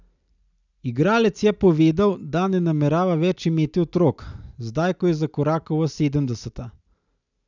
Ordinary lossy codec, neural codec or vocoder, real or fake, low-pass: none; none; real; 7.2 kHz